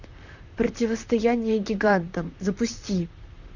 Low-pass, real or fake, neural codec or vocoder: 7.2 kHz; fake; vocoder, 44.1 kHz, 128 mel bands, Pupu-Vocoder